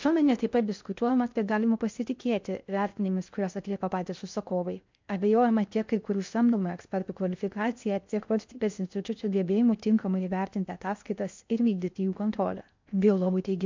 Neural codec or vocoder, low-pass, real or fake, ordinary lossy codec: codec, 16 kHz in and 24 kHz out, 0.6 kbps, FocalCodec, streaming, 2048 codes; 7.2 kHz; fake; MP3, 64 kbps